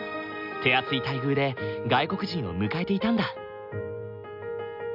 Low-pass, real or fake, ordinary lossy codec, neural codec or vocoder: 5.4 kHz; real; none; none